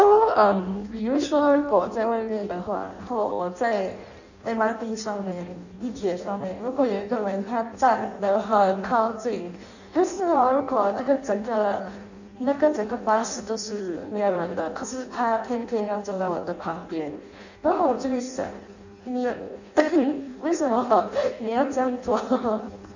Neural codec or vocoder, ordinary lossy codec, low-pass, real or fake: codec, 16 kHz in and 24 kHz out, 0.6 kbps, FireRedTTS-2 codec; none; 7.2 kHz; fake